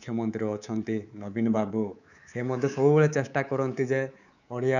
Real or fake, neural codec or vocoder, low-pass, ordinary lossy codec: fake; codec, 24 kHz, 3.1 kbps, DualCodec; 7.2 kHz; none